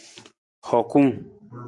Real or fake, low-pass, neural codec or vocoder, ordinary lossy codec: real; 10.8 kHz; none; MP3, 64 kbps